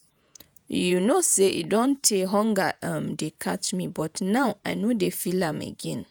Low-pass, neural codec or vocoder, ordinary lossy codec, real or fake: none; vocoder, 48 kHz, 128 mel bands, Vocos; none; fake